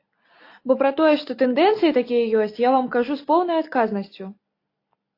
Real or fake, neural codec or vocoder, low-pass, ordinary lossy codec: real; none; 5.4 kHz; AAC, 32 kbps